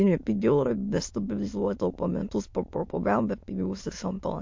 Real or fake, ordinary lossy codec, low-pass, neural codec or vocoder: fake; MP3, 48 kbps; 7.2 kHz; autoencoder, 22.05 kHz, a latent of 192 numbers a frame, VITS, trained on many speakers